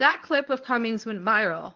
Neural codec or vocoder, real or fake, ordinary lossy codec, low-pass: codec, 24 kHz, 0.9 kbps, WavTokenizer, medium speech release version 1; fake; Opus, 32 kbps; 7.2 kHz